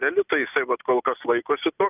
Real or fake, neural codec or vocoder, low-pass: real; none; 3.6 kHz